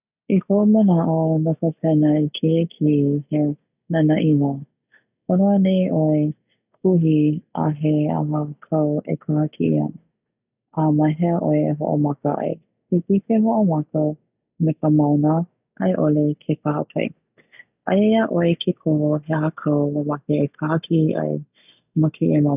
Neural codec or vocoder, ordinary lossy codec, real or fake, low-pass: none; none; real; 3.6 kHz